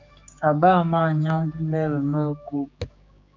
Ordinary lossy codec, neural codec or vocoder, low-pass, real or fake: MP3, 64 kbps; codec, 16 kHz, 4 kbps, X-Codec, HuBERT features, trained on general audio; 7.2 kHz; fake